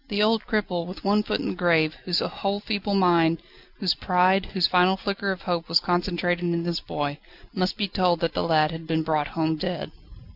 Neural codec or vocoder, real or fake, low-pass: none; real; 5.4 kHz